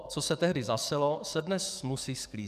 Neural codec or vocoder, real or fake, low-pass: codec, 44.1 kHz, 7.8 kbps, DAC; fake; 14.4 kHz